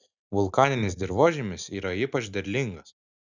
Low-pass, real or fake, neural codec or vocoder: 7.2 kHz; real; none